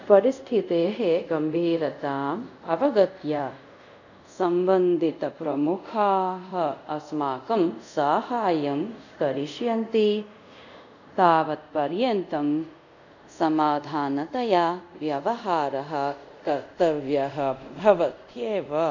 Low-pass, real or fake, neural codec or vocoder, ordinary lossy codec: 7.2 kHz; fake; codec, 24 kHz, 0.5 kbps, DualCodec; none